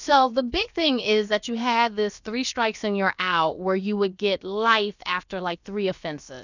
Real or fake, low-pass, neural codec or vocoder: fake; 7.2 kHz; codec, 16 kHz, about 1 kbps, DyCAST, with the encoder's durations